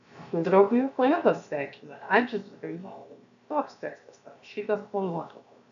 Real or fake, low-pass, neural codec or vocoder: fake; 7.2 kHz; codec, 16 kHz, 0.7 kbps, FocalCodec